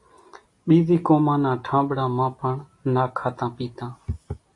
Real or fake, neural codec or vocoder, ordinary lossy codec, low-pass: real; none; AAC, 48 kbps; 10.8 kHz